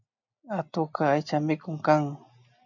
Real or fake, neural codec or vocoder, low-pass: real; none; 7.2 kHz